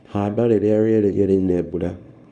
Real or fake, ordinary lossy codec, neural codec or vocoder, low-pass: fake; none; codec, 24 kHz, 0.9 kbps, WavTokenizer, small release; 10.8 kHz